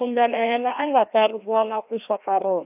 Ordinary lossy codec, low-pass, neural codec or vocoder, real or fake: AAC, 32 kbps; 3.6 kHz; codec, 16 kHz, 1 kbps, FreqCodec, larger model; fake